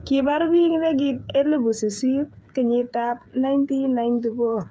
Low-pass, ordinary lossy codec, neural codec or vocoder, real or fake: none; none; codec, 16 kHz, 8 kbps, FreqCodec, smaller model; fake